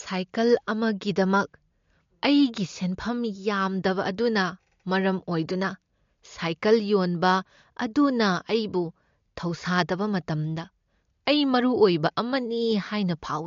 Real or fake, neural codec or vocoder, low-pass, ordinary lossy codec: real; none; 7.2 kHz; MP3, 48 kbps